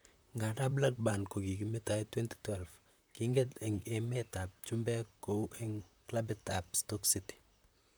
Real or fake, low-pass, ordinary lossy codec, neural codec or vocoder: fake; none; none; vocoder, 44.1 kHz, 128 mel bands, Pupu-Vocoder